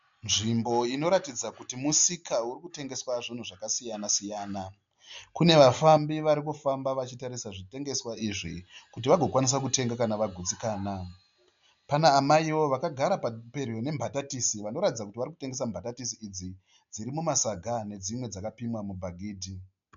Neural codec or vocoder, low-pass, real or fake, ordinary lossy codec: none; 7.2 kHz; real; MP3, 64 kbps